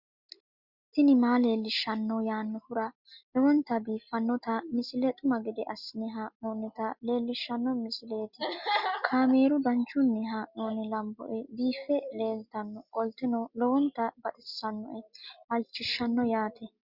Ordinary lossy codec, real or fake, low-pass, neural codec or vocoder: Opus, 64 kbps; real; 5.4 kHz; none